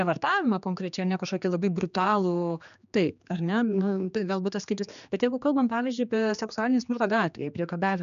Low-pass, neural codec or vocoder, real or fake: 7.2 kHz; codec, 16 kHz, 2 kbps, X-Codec, HuBERT features, trained on general audio; fake